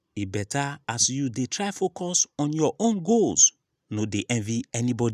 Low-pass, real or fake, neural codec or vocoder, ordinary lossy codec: 14.4 kHz; real; none; none